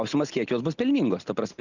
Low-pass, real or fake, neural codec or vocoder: 7.2 kHz; real; none